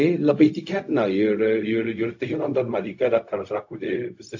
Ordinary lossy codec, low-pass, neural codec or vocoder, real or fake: AAC, 48 kbps; 7.2 kHz; codec, 16 kHz, 0.4 kbps, LongCat-Audio-Codec; fake